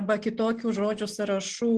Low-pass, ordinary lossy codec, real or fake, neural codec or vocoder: 10.8 kHz; Opus, 16 kbps; real; none